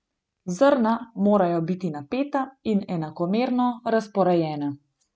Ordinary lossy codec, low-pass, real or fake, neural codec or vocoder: none; none; real; none